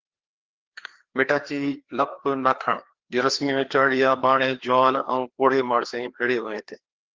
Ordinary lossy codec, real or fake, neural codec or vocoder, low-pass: Opus, 16 kbps; fake; codec, 16 kHz, 2 kbps, FreqCodec, larger model; 7.2 kHz